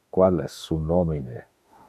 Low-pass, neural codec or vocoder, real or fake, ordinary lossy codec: 14.4 kHz; autoencoder, 48 kHz, 32 numbers a frame, DAC-VAE, trained on Japanese speech; fake; AAC, 96 kbps